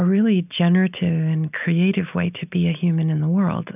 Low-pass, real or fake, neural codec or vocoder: 3.6 kHz; real; none